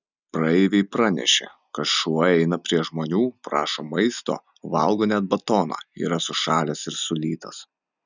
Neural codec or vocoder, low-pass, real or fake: none; 7.2 kHz; real